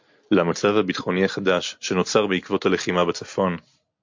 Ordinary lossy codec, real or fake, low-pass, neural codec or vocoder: MP3, 48 kbps; real; 7.2 kHz; none